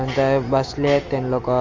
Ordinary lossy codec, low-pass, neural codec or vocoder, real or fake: Opus, 32 kbps; 7.2 kHz; none; real